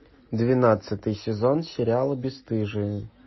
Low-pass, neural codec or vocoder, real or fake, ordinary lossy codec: 7.2 kHz; none; real; MP3, 24 kbps